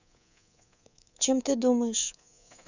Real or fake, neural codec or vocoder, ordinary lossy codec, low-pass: fake; codec, 24 kHz, 3.1 kbps, DualCodec; none; 7.2 kHz